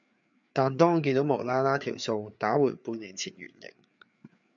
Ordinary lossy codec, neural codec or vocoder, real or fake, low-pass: MP3, 64 kbps; codec, 16 kHz, 4 kbps, FreqCodec, larger model; fake; 7.2 kHz